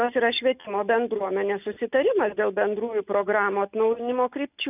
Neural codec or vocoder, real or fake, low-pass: none; real; 3.6 kHz